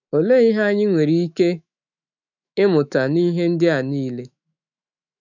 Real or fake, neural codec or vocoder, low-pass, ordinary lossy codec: fake; autoencoder, 48 kHz, 128 numbers a frame, DAC-VAE, trained on Japanese speech; 7.2 kHz; none